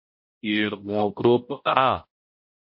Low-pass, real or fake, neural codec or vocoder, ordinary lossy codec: 5.4 kHz; fake; codec, 16 kHz, 0.5 kbps, X-Codec, HuBERT features, trained on balanced general audio; MP3, 32 kbps